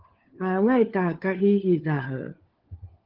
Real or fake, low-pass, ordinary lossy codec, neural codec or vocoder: fake; 5.4 kHz; Opus, 16 kbps; codec, 16 kHz, 4 kbps, FunCodec, trained on LibriTTS, 50 frames a second